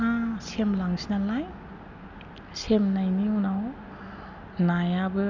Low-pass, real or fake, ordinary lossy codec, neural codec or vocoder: 7.2 kHz; real; none; none